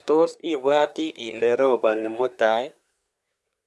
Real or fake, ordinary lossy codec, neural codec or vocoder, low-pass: fake; none; codec, 24 kHz, 1 kbps, SNAC; none